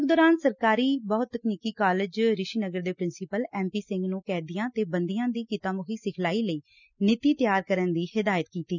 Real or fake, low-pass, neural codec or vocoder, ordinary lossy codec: real; 7.2 kHz; none; none